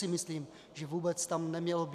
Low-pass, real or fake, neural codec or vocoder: 14.4 kHz; real; none